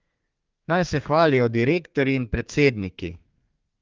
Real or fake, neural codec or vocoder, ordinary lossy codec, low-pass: fake; codec, 32 kHz, 1.9 kbps, SNAC; Opus, 32 kbps; 7.2 kHz